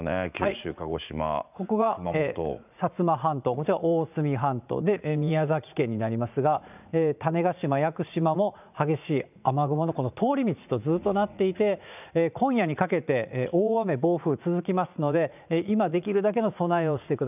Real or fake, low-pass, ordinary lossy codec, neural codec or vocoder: fake; 3.6 kHz; none; vocoder, 44.1 kHz, 80 mel bands, Vocos